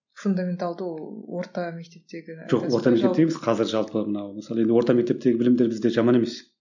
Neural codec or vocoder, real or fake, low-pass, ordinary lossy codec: none; real; 7.2 kHz; none